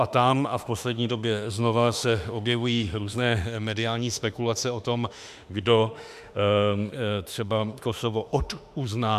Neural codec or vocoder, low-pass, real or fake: autoencoder, 48 kHz, 32 numbers a frame, DAC-VAE, trained on Japanese speech; 14.4 kHz; fake